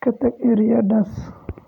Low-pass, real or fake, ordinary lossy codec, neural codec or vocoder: 19.8 kHz; fake; none; vocoder, 44.1 kHz, 128 mel bands every 512 samples, BigVGAN v2